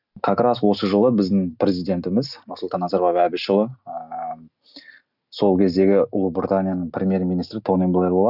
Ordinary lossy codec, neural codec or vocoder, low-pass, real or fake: none; none; 5.4 kHz; real